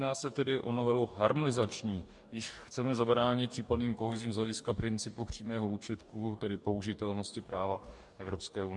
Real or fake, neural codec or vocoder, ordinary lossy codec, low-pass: fake; codec, 44.1 kHz, 2.6 kbps, DAC; MP3, 64 kbps; 10.8 kHz